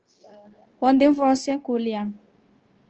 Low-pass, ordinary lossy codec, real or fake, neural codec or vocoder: 7.2 kHz; Opus, 16 kbps; fake; codec, 16 kHz, 0.9 kbps, LongCat-Audio-Codec